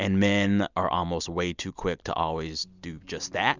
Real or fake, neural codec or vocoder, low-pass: real; none; 7.2 kHz